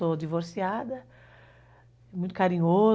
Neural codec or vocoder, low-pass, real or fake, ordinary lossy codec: none; none; real; none